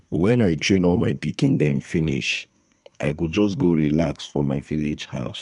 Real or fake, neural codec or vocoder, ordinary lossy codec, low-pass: fake; codec, 24 kHz, 1 kbps, SNAC; none; 10.8 kHz